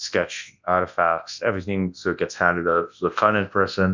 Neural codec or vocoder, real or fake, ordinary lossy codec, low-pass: codec, 24 kHz, 0.9 kbps, WavTokenizer, large speech release; fake; MP3, 64 kbps; 7.2 kHz